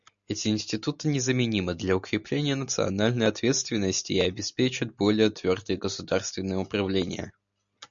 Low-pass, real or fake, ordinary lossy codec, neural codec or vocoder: 7.2 kHz; real; MP3, 64 kbps; none